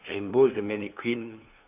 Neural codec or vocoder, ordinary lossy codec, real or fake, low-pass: codec, 16 kHz, 2 kbps, FunCodec, trained on LibriTTS, 25 frames a second; none; fake; 3.6 kHz